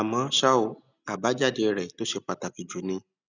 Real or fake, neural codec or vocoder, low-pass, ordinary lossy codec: real; none; 7.2 kHz; none